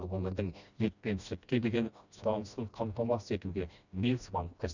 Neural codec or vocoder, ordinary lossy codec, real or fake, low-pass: codec, 16 kHz, 1 kbps, FreqCodec, smaller model; none; fake; 7.2 kHz